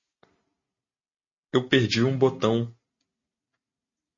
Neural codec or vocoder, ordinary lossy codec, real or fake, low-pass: none; MP3, 32 kbps; real; 7.2 kHz